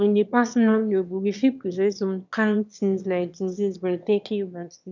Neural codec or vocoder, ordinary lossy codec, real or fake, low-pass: autoencoder, 22.05 kHz, a latent of 192 numbers a frame, VITS, trained on one speaker; none; fake; 7.2 kHz